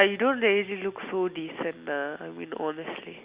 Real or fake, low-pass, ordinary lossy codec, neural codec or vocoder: real; 3.6 kHz; Opus, 64 kbps; none